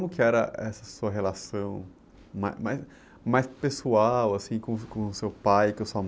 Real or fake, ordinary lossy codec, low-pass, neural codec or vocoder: real; none; none; none